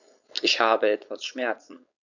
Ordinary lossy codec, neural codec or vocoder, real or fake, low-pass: none; codec, 16 kHz, 4.8 kbps, FACodec; fake; 7.2 kHz